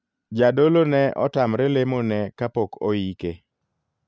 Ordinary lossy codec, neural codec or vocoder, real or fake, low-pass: none; none; real; none